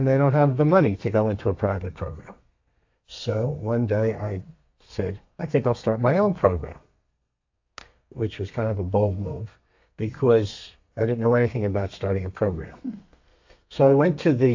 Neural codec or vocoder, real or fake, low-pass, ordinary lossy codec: codec, 32 kHz, 1.9 kbps, SNAC; fake; 7.2 kHz; AAC, 48 kbps